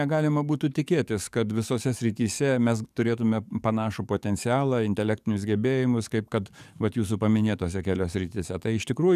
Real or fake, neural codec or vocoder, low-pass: fake; codec, 44.1 kHz, 7.8 kbps, DAC; 14.4 kHz